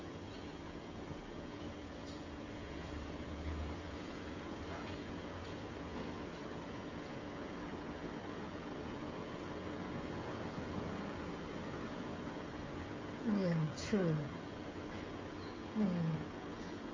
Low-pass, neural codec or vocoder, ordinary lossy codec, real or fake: 7.2 kHz; codec, 16 kHz, 16 kbps, FreqCodec, smaller model; MP3, 32 kbps; fake